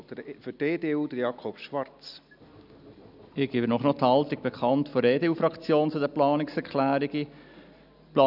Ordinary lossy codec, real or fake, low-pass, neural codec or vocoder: none; real; 5.4 kHz; none